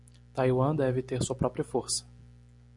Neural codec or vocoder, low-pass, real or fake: none; 10.8 kHz; real